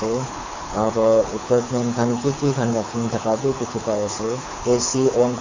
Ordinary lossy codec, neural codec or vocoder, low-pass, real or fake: AAC, 48 kbps; codec, 24 kHz, 6 kbps, HILCodec; 7.2 kHz; fake